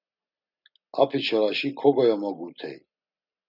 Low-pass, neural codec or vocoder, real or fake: 5.4 kHz; none; real